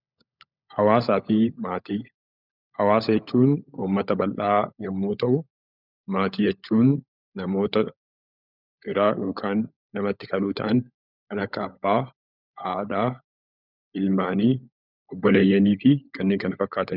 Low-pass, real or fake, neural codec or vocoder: 5.4 kHz; fake; codec, 16 kHz, 16 kbps, FunCodec, trained on LibriTTS, 50 frames a second